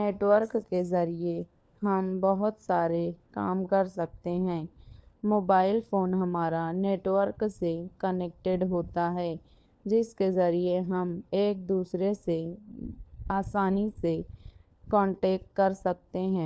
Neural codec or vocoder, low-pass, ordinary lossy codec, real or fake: codec, 16 kHz, 4 kbps, FunCodec, trained on LibriTTS, 50 frames a second; none; none; fake